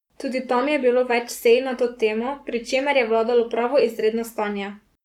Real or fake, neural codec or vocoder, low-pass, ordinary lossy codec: fake; codec, 44.1 kHz, 7.8 kbps, Pupu-Codec; 19.8 kHz; none